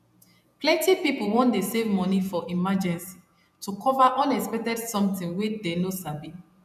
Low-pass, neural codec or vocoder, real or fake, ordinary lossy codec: 14.4 kHz; vocoder, 48 kHz, 128 mel bands, Vocos; fake; none